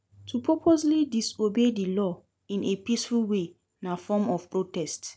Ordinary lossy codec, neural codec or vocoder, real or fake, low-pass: none; none; real; none